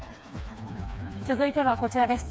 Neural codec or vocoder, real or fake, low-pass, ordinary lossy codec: codec, 16 kHz, 2 kbps, FreqCodec, smaller model; fake; none; none